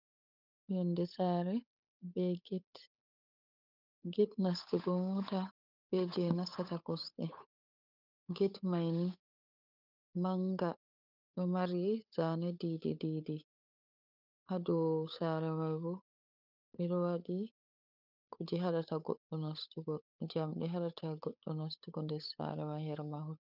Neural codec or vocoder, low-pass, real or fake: codec, 16 kHz, 8 kbps, FunCodec, trained on Chinese and English, 25 frames a second; 5.4 kHz; fake